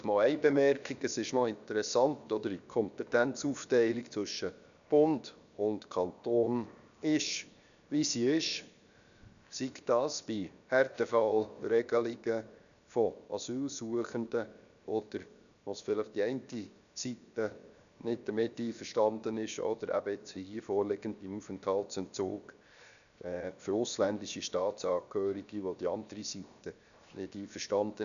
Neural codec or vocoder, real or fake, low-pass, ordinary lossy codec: codec, 16 kHz, 0.7 kbps, FocalCodec; fake; 7.2 kHz; none